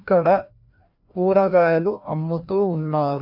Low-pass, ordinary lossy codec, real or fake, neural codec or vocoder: 5.4 kHz; none; fake; codec, 16 kHz, 1 kbps, FreqCodec, larger model